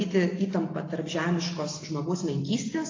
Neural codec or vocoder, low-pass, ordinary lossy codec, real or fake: none; 7.2 kHz; AAC, 32 kbps; real